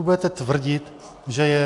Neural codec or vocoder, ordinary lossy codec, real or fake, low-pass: none; AAC, 48 kbps; real; 10.8 kHz